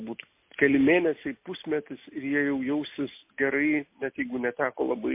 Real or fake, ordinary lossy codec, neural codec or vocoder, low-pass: real; MP3, 24 kbps; none; 3.6 kHz